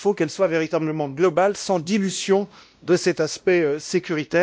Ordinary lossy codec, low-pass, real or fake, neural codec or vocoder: none; none; fake; codec, 16 kHz, 1 kbps, X-Codec, WavLM features, trained on Multilingual LibriSpeech